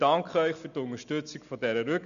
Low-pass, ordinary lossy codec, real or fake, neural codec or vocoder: 7.2 kHz; none; real; none